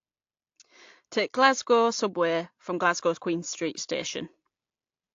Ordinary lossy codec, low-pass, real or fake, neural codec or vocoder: AAC, 48 kbps; 7.2 kHz; real; none